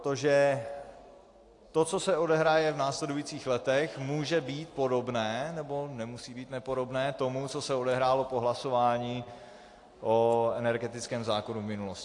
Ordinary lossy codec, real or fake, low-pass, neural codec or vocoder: AAC, 48 kbps; real; 10.8 kHz; none